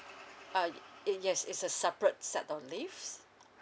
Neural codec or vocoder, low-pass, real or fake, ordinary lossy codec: none; none; real; none